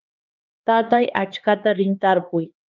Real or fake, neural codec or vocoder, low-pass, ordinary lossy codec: fake; codec, 16 kHz, 2 kbps, X-Codec, HuBERT features, trained on LibriSpeech; 7.2 kHz; Opus, 24 kbps